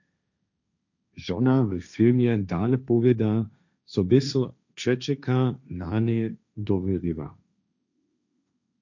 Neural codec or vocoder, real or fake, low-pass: codec, 16 kHz, 1.1 kbps, Voila-Tokenizer; fake; 7.2 kHz